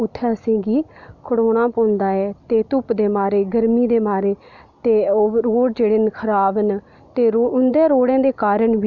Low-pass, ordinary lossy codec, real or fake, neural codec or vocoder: 7.2 kHz; none; real; none